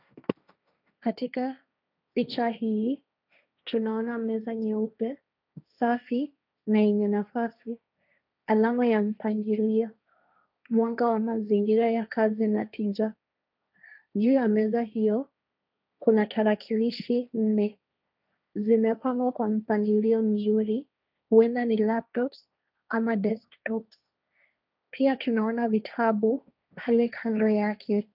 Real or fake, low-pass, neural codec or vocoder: fake; 5.4 kHz; codec, 16 kHz, 1.1 kbps, Voila-Tokenizer